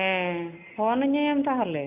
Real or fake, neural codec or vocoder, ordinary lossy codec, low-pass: real; none; none; 3.6 kHz